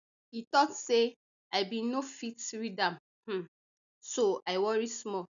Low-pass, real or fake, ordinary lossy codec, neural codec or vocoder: 7.2 kHz; real; none; none